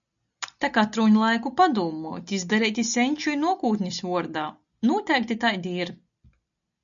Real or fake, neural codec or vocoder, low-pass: real; none; 7.2 kHz